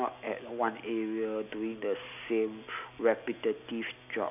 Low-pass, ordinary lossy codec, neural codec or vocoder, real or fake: 3.6 kHz; none; none; real